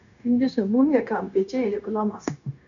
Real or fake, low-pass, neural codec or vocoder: fake; 7.2 kHz; codec, 16 kHz, 0.9 kbps, LongCat-Audio-Codec